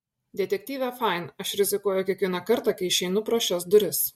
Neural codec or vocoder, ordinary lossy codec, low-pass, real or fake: none; MP3, 64 kbps; 19.8 kHz; real